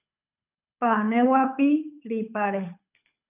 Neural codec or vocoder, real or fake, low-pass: codec, 24 kHz, 6 kbps, HILCodec; fake; 3.6 kHz